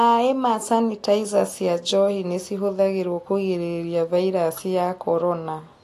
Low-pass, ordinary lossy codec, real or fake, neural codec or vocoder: 14.4 kHz; AAC, 48 kbps; real; none